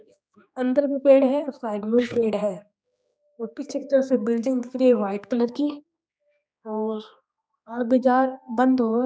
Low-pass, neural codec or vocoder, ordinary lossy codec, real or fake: none; codec, 16 kHz, 2 kbps, X-Codec, HuBERT features, trained on general audio; none; fake